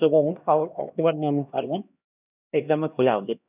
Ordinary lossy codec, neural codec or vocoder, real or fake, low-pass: none; codec, 16 kHz, 1 kbps, X-Codec, HuBERT features, trained on LibriSpeech; fake; 3.6 kHz